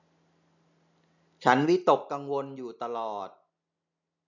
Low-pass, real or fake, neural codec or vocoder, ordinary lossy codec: 7.2 kHz; real; none; none